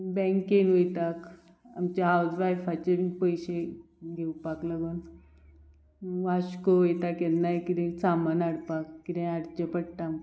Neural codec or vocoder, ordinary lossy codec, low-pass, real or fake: none; none; none; real